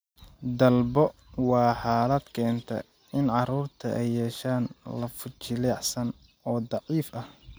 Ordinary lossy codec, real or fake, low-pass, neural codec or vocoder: none; real; none; none